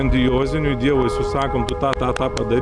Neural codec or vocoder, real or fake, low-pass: none; real; 9.9 kHz